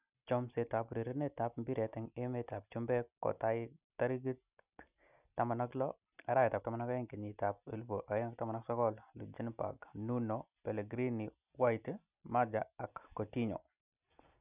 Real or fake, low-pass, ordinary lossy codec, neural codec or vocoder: real; 3.6 kHz; none; none